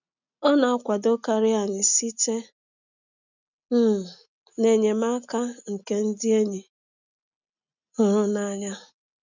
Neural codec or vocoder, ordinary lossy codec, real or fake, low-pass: none; none; real; 7.2 kHz